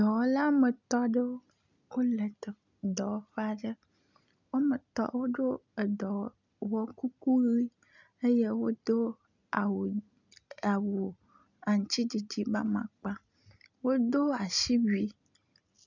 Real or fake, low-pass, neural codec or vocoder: real; 7.2 kHz; none